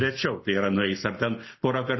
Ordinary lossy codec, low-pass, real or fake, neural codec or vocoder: MP3, 24 kbps; 7.2 kHz; real; none